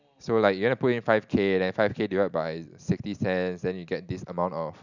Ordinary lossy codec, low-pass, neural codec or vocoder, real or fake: none; 7.2 kHz; none; real